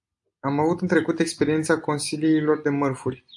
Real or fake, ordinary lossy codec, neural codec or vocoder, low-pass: real; AAC, 64 kbps; none; 10.8 kHz